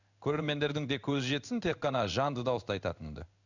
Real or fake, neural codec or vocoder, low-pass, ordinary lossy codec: fake; codec, 16 kHz in and 24 kHz out, 1 kbps, XY-Tokenizer; 7.2 kHz; none